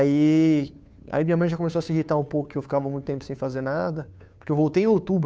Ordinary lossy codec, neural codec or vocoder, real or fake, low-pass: none; codec, 16 kHz, 2 kbps, FunCodec, trained on Chinese and English, 25 frames a second; fake; none